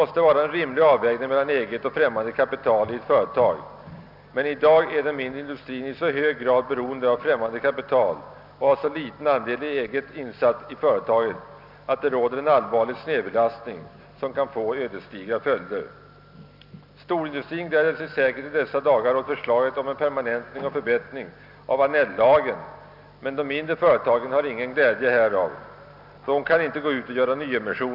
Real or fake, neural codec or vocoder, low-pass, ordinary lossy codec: real; none; 5.4 kHz; none